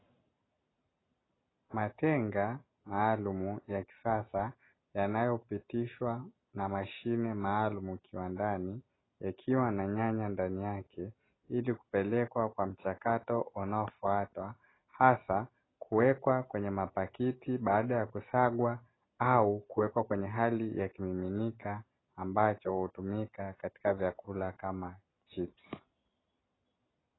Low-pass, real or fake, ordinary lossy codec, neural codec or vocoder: 7.2 kHz; real; AAC, 16 kbps; none